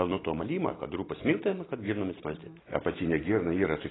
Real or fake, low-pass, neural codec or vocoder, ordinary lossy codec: real; 7.2 kHz; none; AAC, 16 kbps